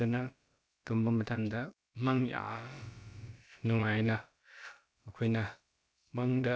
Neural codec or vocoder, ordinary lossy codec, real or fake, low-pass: codec, 16 kHz, about 1 kbps, DyCAST, with the encoder's durations; none; fake; none